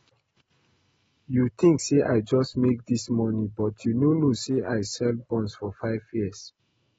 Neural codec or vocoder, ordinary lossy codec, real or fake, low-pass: none; AAC, 24 kbps; real; 19.8 kHz